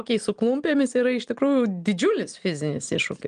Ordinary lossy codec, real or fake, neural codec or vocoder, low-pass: Opus, 24 kbps; real; none; 9.9 kHz